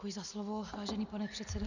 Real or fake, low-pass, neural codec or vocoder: real; 7.2 kHz; none